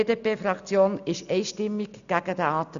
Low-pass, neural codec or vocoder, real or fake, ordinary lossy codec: 7.2 kHz; none; real; none